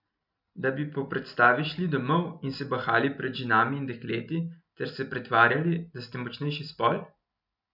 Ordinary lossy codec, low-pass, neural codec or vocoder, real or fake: none; 5.4 kHz; none; real